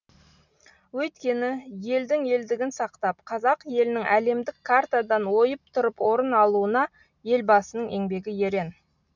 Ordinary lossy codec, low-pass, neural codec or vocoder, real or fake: none; 7.2 kHz; none; real